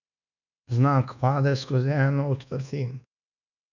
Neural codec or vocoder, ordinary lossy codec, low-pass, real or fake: codec, 24 kHz, 1.2 kbps, DualCodec; none; 7.2 kHz; fake